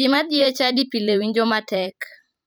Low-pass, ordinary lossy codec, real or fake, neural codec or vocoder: none; none; fake; vocoder, 44.1 kHz, 128 mel bands every 512 samples, BigVGAN v2